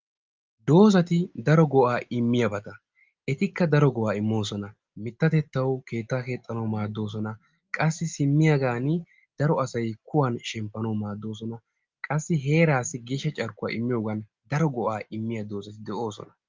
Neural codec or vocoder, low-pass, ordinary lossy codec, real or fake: none; 7.2 kHz; Opus, 24 kbps; real